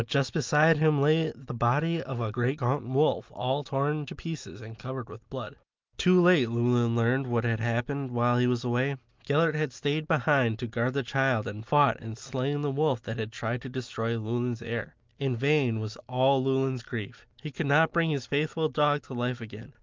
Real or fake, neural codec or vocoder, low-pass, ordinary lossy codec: real; none; 7.2 kHz; Opus, 24 kbps